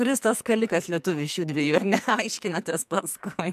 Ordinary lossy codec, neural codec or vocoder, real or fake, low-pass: MP3, 96 kbps; codec, 44.1 kHz, 2.6 kbps, SNAC; fake; 14.4 kHz